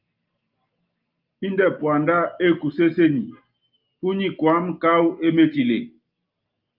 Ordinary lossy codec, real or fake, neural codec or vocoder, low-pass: Opus, 32 kbps; real; none; 5.4 kHz